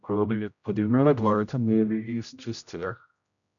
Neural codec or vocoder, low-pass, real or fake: codec, 16 kHz, 0.5 kbps, X-Codec, HuBERT features, trained on general audio; 7.2 kHz; fake